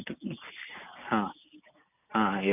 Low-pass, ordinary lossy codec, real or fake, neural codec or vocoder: 3.6 kHz; none; real; none